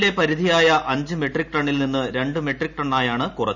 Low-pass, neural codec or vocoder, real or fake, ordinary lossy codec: 7.2 kHz; none; real; none